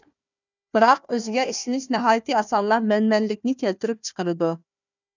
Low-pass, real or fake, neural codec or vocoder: 7.2 kHz; fake; codec, 16 kHz, 1 kbps, FunCodec, trained on Chinese and English, 50 frames a second